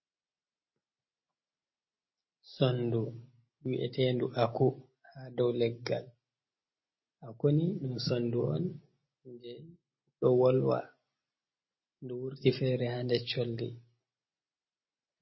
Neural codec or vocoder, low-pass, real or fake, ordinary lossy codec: none; 7.2 kHz; real; MP3, 24 kbps